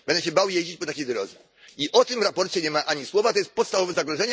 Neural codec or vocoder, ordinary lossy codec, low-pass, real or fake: none; none; none; real